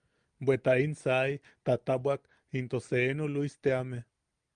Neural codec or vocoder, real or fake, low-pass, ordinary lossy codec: none; real; 9.9 kHz; Opus, 32 kbps